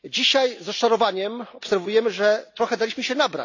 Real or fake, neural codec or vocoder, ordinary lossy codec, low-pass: real; none; AAC, 48 kbps; 7.2 kHz